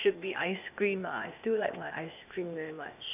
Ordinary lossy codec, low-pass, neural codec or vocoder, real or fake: none; 3.6 kHz; codec, 16 kHz, 0.8 kbps, ZipCodec; fake